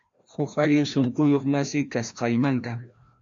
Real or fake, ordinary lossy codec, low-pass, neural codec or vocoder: fake; MP3, 64 kbps; 7.2 kHz; codec, 16 kHz, 1 kbps, FreqCodec, larger model